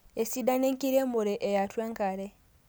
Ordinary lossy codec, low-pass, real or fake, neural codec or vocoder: none; none; real; none